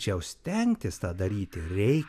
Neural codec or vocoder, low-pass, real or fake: none; 14.4 kHz; real